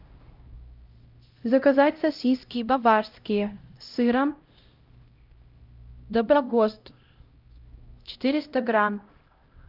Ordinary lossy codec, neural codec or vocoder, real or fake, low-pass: Opus, 24 kbps; codec, 16 kHz, 0.5 kbps, X-Codec, HuBERT features, trained on LibriSpeech; fake; 5.4 kHz